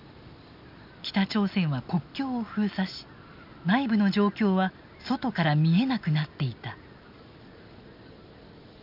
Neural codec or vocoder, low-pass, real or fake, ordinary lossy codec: none; 5.4 kHz; real; none